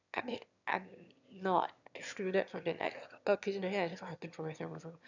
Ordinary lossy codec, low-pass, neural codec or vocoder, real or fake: none; 7.2 kHz; autoencoder, 22.05 kHz, a latent of 192 numbers a frame, VITS, trained on one speaker; fake